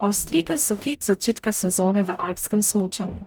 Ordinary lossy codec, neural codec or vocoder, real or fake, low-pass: none; codec, 44.1 kHz, 0.9 kbps, DAC; fake; none